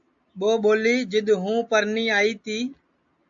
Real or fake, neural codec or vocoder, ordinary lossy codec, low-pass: real; none; AAC, 64 kbps; 7.2 kHz